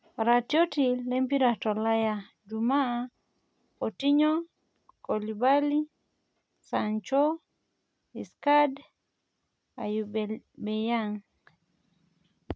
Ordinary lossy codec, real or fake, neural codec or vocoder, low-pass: none; real; none; none